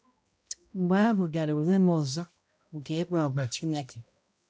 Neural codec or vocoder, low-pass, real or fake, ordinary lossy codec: codec, 16 kHz, 0.5 kbps, X-Codec, HuBERT features, trained on balanced general audio; none; fake; none